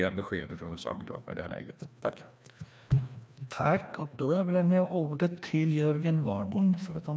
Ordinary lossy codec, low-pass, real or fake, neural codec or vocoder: none; none; fake; codec, 16 kHz, 1 kbps, FreqCodec, larger model